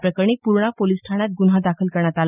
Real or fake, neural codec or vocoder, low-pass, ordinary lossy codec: real; none; 3.6 kHz; none